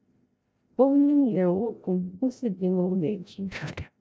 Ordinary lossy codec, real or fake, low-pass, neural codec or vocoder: none; fake; none; codec, 16 kHz, 0.5 kbps, FreqCodec, larger model